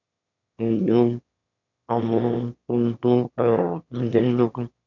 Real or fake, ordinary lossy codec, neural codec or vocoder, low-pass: fake; none; autoencoder, 22.05 kHz, a latent of 192 numbers a frame, VITS, trained on one speaker; 7.2 kHz